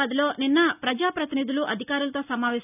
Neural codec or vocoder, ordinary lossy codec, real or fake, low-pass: none; none; real; 3.6 kHz